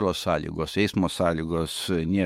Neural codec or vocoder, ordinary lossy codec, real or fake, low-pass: autoencoder, 48 kHz, 128 numbers a frame, DAC-VAE, trained on Japanese speech; MP3, 64 kbps; fake; 19.8 kHz